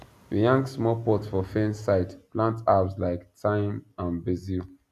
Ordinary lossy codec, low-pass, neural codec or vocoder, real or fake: none; 14.4 kHz; none; real